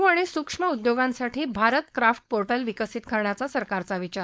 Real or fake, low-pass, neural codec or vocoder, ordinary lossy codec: fake; none; codec, 16 kHz, 4.8 kbps, FACodec; none